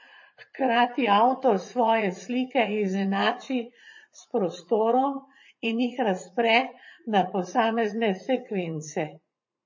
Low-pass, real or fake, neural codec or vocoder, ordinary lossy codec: 7.2 kHz; fake; vocoder, 44.1 kHz, 80 mel bands, Vocos; MP3, 32 kbps